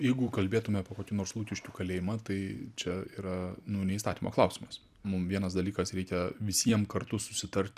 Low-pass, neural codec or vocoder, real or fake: 14.4 kHz; vocoder, 44.1 kHz, 128 mel bands every 256 samples, BigVGAN v2; fake